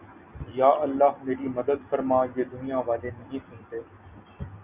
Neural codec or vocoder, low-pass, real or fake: none; 3.6 kHz; real